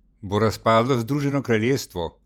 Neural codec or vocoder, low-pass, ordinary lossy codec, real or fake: vocoder, 48 kHz, 128 mel bands, Vocos; 19.8 kHz; none; fake